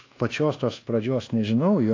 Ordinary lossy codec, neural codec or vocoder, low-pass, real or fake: AAC, 32 kbps; codec, 24 kHz, 1.2 kbps, DualCodec; 7.2 kHz; fake